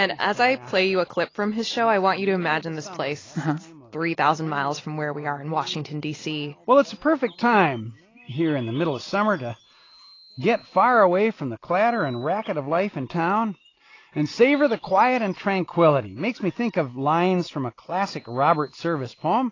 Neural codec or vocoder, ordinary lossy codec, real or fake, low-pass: none; AAC, 32 kbps; real; 7.2 kHz